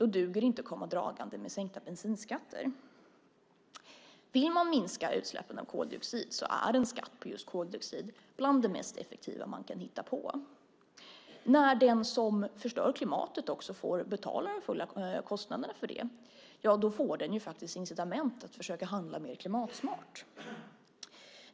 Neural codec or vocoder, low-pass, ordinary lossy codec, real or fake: none; none; none; real